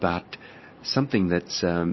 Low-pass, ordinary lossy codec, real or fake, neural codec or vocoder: 7.2 kHz; MP3, 24 kbps; real; none